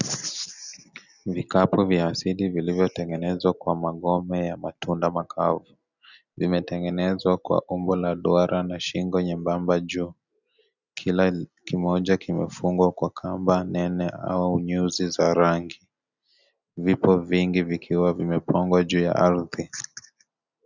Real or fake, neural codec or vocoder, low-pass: real; none; 7.2 kHz